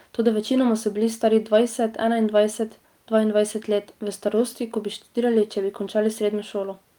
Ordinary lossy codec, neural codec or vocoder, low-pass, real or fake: Opus, 32 kbps; none; 19.8 kHz; real